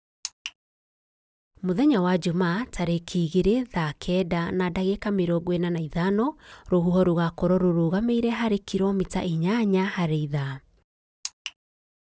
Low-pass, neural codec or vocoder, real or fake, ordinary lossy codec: none; none; real; none